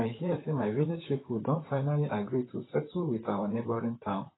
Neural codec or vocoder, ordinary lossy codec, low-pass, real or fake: vocoder, 44.1 kHz, 128 mel bands, Pupu-Vocoder; AAC, 16 kbps; 7.2 kHz; fake